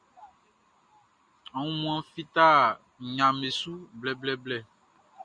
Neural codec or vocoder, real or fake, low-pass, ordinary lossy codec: none; real; 9.9 kHz; Opus, 64 kbps